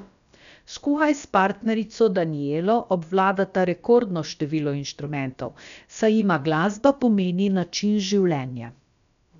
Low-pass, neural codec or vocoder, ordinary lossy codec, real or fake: 7.2 kHz; codec, 16 kHz, about 1 kbps, DyCAST, with the encoder's durations; MP3, 96 kbps; fake